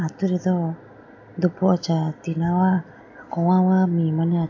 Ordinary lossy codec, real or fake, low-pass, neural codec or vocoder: AAC, 48 kbps; real; 7.2 kHz; none